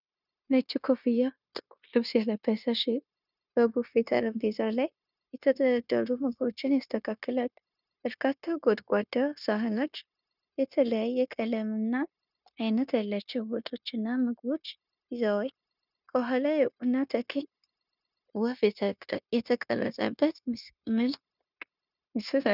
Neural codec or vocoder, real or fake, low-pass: codec, 16 kHz, 0.9 kbps, LongCat-Audio-Codec; fake; 5.4 kHz